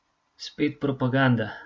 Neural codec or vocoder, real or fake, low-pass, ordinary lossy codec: none; real; none; none